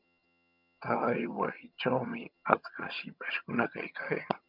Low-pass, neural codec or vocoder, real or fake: 5.4 kHz; vocoder, 22.05 kHz, 80 mel bands, HiFi-GAN; fake